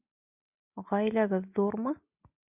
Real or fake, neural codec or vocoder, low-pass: real; none; 3.6 kHz